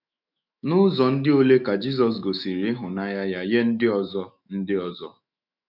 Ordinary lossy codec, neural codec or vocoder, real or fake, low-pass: none; autoencoder, 48 kHz, 128 numbers a frame, DAC-VAE, trained on Japanese speech; fake; 5.4 kHz